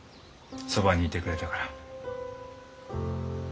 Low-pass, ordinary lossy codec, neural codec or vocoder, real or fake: none; none; none; real